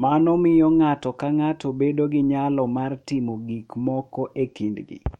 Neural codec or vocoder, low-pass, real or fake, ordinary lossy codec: autoencoder, 48 kHz, 128 numbers a frame, DAC-VAE, trained on Japanese speech; 19.8 kHz; fake; MP3, 64 kbps